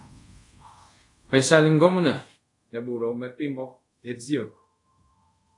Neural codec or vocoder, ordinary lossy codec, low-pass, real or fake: codec, 24 kHz, 0.5 kbps, DualCodec; AAC, 48 kbps; 10.8 kHz; fake